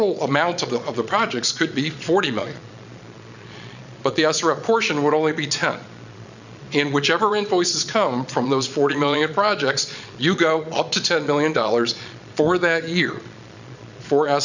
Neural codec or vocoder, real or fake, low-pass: vocoder, 22.05 kHz, 80 mel bands, Vocos; fake; 7.2 kHz